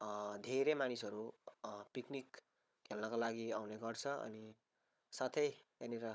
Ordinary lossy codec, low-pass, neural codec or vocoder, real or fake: none; none; codec, 16 kHz, 16 kbps, FunCodec, trained on Chinese and English, 50 frames a second; fake